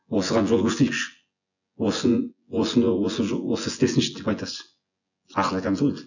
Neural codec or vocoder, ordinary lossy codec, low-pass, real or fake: vocoder, 24 kHz, 100 mel bands, Vocos; none; 7.2 kHz; fake